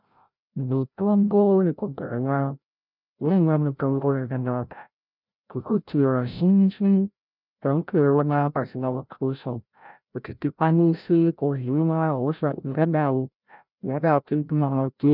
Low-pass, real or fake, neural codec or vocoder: 5.4 kHz; fake; codec, 16 kHz, 0.5 kbps, FreqCodec, larger model